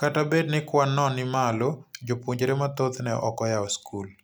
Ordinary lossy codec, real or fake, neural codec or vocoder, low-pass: none; real; none; none